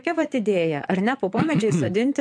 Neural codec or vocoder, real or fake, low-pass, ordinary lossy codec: vocoder, 22.05 kHz, 80 mel bands, Vocos; fake; 9.9 kHz; MP3, 64 kbps